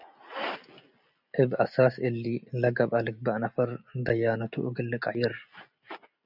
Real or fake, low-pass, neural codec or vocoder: real; 5.4 kHz; none